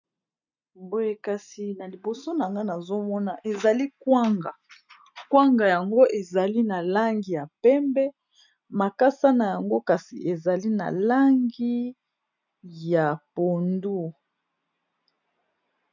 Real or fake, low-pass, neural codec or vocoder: real; 7.2 kHz; none